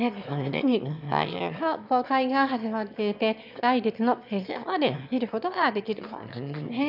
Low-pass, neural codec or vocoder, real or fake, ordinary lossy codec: 5.4 kHz; autoencoder, 22.05 kHz, a latent of 192 numbers a frame, VITS, trained on one speaker; fake; none